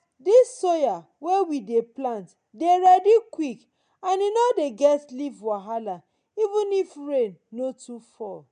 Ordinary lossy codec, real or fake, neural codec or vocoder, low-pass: MP3, 64 kbps; real; none; 9.9 kHz